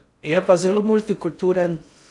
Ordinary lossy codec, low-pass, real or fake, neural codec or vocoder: none; 10.8 kHz; fake; codec, 16 kHz in and 24 kHz out, 0.6 kbps, FocalCodec, streaming, 4096 codes